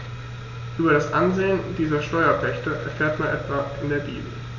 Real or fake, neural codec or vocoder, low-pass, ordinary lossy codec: real; none; 7.2 kHz; none